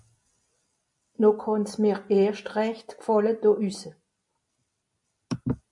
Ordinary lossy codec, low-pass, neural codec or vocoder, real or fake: MP3, 48 kbps; 10.8 kHz; none; real